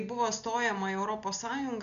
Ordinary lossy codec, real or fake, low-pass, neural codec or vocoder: MP3, 96 kbps; real; 7.2 kHz; none